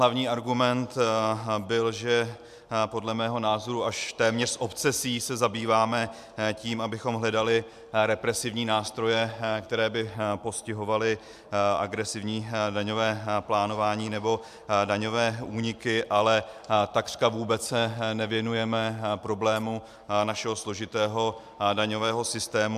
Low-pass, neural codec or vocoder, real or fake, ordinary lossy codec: 14.4 kHz; none; real; MP3, 96 kbps